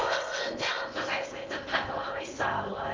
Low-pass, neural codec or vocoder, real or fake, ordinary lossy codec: 7.2 kHz; codec, 16 kHz in and 24 kHz out, 0.6 kbps, FocalCodec, streaming, 4096 codes; fake; Opus, 32 kbps